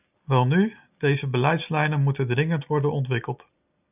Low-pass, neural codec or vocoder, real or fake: 3.6 kHz; none; real